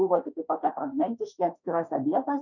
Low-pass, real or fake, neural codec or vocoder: 7.2 kHz; fake; autoencoder, 48 kHz, 32 numbers a frame, DAC-VAE, trained on Japanese speech